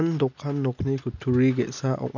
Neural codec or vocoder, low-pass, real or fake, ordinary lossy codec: none; 7.2 kHz; real; none